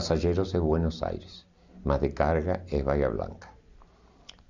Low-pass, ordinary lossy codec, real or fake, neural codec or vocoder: 7.2 kHz; none; real; none